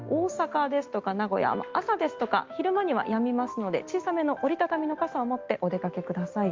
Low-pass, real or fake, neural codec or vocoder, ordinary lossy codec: 7.2 kHz; real; none; Opus, 32 kbps